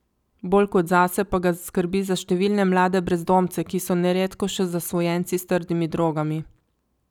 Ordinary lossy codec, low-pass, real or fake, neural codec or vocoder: none; 19.8 kHz; real; none